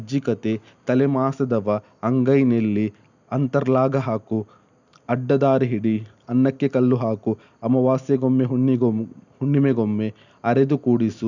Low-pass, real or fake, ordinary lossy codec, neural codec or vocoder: 7.2 kHz; real; none; none